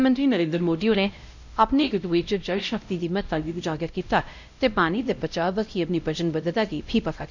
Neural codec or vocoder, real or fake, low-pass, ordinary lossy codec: codec, 16 kHz, 0.5 kbps, X-Codec, WavLM features, trained on Multilingual LibriSpeech; fake; 7.2 kHz; none